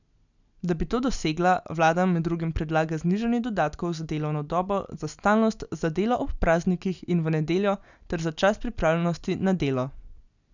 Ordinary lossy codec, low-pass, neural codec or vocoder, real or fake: none; 7.2 kHz; none; real